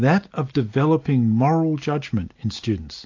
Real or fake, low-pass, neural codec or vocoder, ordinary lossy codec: real; 7.2 kHz; none; AAC, 48 kbps